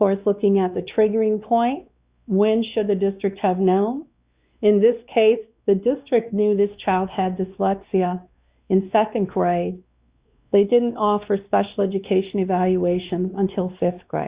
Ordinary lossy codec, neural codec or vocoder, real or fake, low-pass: Opus, 64 kbps; codec, 16 kHz, 2 kbps, X-Codec, WavLM features, trained on Multilingual LibriSpeech; fake; 3.6 kHz